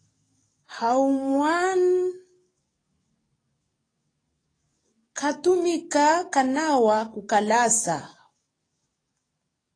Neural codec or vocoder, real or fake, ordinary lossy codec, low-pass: codec, 44.1 kHz, 7.8 kbps, DAC; fake; AAC, 32 kbps; 9.9 kHz